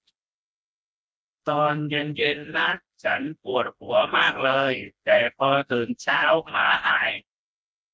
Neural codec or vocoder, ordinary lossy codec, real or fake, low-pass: codec, 16 kHz, 1 kbps, FreqCodec, smaller model; none; fake; none